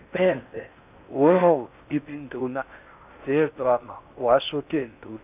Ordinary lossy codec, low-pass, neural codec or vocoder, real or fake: none; 3.6 kHz; codec, 16 kHz in and 24 kHz out, 0.6 kbps, FocalCodec, streaming, 4096 codes; fake